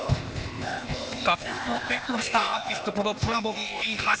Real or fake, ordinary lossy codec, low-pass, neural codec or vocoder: fake; none; none; codec, 16 kHz, 0.8 kbps, ZipCodec